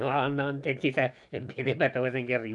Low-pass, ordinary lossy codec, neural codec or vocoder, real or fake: 10.8 kHz; none; codec, 44.1 kHz, 7.8 kbps, DAC; fake